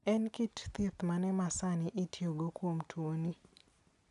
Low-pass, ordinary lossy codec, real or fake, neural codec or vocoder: 10.8 kHz; none; real; none